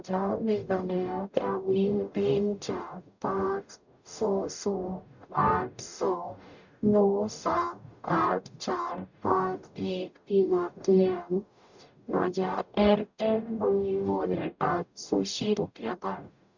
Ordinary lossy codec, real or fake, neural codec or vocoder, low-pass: none; fake; codec, 44.1 kHz, 0.9 kbps, DAC; 7.2 kHz